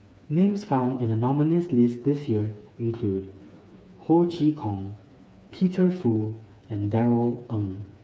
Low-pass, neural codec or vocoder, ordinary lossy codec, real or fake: none; codec, 16 kHz, 4 kbps, FreqCodec, smaller model; none; fake